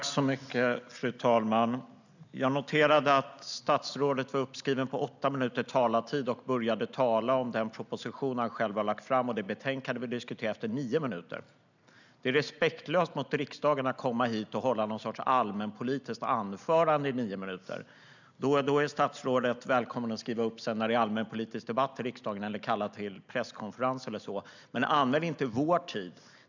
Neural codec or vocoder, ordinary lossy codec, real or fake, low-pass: none; none; real; 7.2 kHz